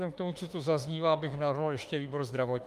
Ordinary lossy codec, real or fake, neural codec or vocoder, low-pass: Opus, 32 kbps; fake; autoencoder, 48 kHz, 32 numbers a frame, DAC-VAE, trained on Japanese speech; 14.4 kHz